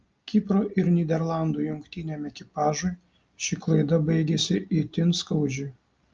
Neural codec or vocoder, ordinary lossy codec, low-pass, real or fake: none; Opus, 24 kbps; 7.2 kHz; real